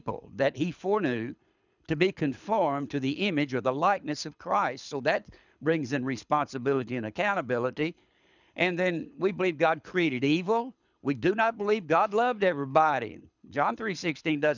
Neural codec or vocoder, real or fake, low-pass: codec, 24 kHz, 6 kbps, HILCodec; fake; 7.2 kHz